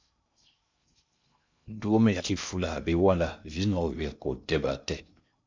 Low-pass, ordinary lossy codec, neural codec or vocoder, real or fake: 7.2 kHz; MP3, 64 kbps; codec, 16 kHz in and 24 kHz out, 0.6 kbps, FocalCodec, streaming, 2048 codes; fake